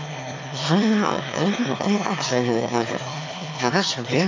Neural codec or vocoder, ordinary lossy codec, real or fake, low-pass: autoencoder, 22.05 kHz, a latent of 192 numbers a frame, VITS, trained on one speaker; MP3, 64 kbps; fake; 7.2 kHz